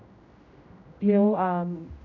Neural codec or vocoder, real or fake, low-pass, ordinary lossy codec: codec, 16 kHz, 0.5 kbps, X-Codec, HuBERT features, trained on general audio; fake; 7.2 kHz; none